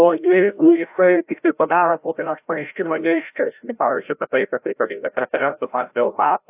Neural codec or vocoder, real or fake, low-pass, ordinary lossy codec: codec, 16 kHz, 0.5 kbps, FreqCodec, larger model; fake; 3.6 kHz; AAC, 32 kbps